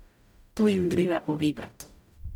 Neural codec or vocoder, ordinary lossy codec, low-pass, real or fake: codec, 44.1 kHz, 0.9 kbps, DAC; none; 19.8 kHz; fake